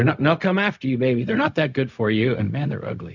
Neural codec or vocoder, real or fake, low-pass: codec, 16 kHz, 0.4 kbps, LongCat-Audio-Codec; fake; 7.2 kHz